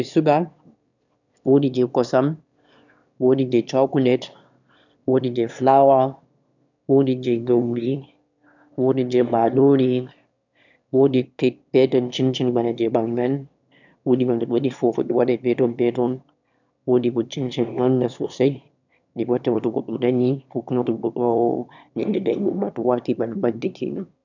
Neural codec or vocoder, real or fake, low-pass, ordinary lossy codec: autoencoder, 22.05 kHz, a latent of 192 numbers a frame, VITS, trained on one speaker; fake; 7.2 kHz; none